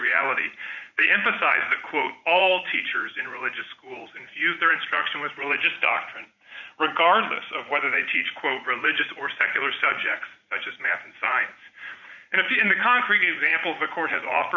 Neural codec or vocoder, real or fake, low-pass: vocoder, 44.1 kHz, 80 mel bands, Vocos; fake; 7.2 kHz